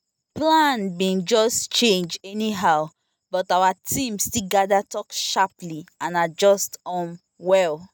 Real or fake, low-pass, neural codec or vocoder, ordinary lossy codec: real; none; none; none